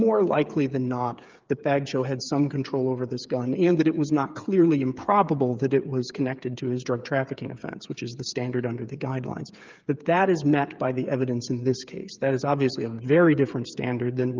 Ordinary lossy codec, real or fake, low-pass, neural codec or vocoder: Opus, 24 kbps; fake; 7.2 kHz; codec, 16 kHz, 8 kbps, FreqCodec, smaller model